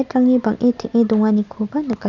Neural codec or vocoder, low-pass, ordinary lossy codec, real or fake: none; 7.2 kHz; none; real